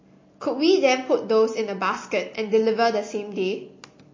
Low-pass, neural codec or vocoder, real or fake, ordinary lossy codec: 7.2 kHz; none; real; MP3, 32 kbps